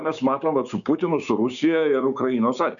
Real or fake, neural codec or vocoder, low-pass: fake; codec, 16 kHz, 6 kbps, DAC; 7.2 kHz